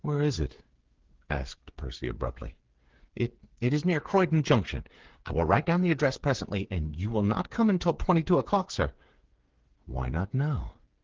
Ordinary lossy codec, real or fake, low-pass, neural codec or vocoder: Opus, 16 kbps; fake; 7.2 kHz; codec, 16 kHz, 8 kbps, FreqCodec, smaller model